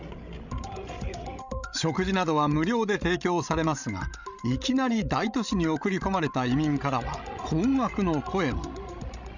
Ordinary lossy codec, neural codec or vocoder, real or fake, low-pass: none; codec, 16 kHz, 16 kbps, FreqCodec, larger model; fake; 7.2 kHz